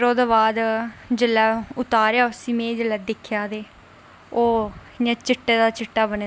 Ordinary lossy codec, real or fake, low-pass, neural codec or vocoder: none; real; none; none